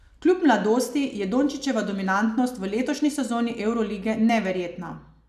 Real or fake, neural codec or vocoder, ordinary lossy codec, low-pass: real; none; none; 14.4 kHz